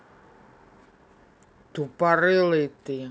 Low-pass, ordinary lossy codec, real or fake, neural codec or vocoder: none; none; real; none